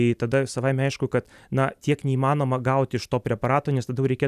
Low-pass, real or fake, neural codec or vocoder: 14.4 kHz; real; none